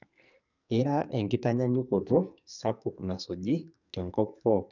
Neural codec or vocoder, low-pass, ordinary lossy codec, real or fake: codec, 44.1 kHz, 2.6 kbps, SNAC; 7.2 kHz; none; fake